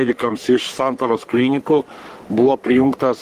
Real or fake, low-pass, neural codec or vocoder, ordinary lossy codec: fake; 14.4 kHz; codec, 44.1 kHz, 3.4 kbps, Pupu-Codec; Opus, 24 kbps